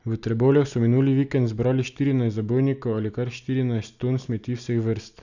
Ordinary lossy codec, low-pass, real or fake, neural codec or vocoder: none; 7.2 kHz; real; none